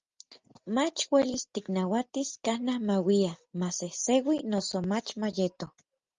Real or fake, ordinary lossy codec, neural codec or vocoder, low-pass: real; Opus, 32 kbps; none; 7.2 kHz